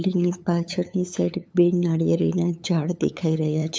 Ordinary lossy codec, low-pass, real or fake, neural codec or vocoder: none; none; fake; codec, 16 kHz, 8 kbps, FunCodec, trained on LibriTTS, 25 frames a second